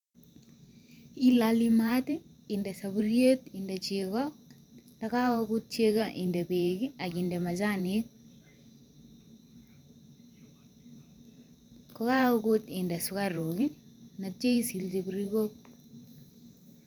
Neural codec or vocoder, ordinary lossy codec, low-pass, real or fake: vocoder, 44.1 kHz, 128 mel bands every 512 samples, BigVGAN v2; none; 19.8 kHz; fake